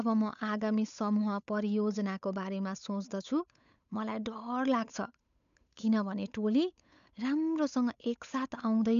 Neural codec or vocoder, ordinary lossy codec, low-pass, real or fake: codec, 16 kHz, 8 kbps, FunCodec, trained on Chinese and English, 25 frames a second; MP3, 96 kbps; 7.2 kHz; fake